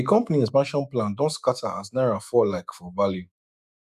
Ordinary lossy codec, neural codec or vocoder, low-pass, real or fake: none; autoencoder, 48 kHz, 128 numbers a frame, DAC-VAE, trained on Japanese speech; 14.4 kHz; fake